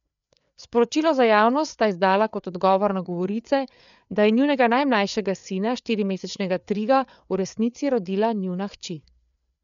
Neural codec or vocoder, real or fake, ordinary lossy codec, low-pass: codec, 16 kHz, 4 kbps, FreqCodec, larger model; fake; none; 7.2 kHz